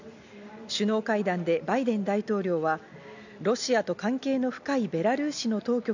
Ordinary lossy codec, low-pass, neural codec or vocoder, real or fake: none; 7.2 kHz; none; real